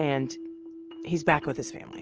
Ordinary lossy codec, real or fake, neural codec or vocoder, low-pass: Opus, 16 kbps; real; none; 7.2 kHz